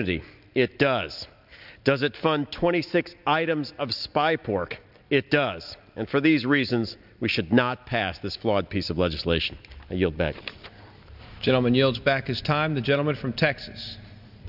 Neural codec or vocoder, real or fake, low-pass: none; real; 5.4 kHz